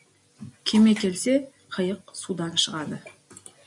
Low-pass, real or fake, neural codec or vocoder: 10.8 kHz; real; none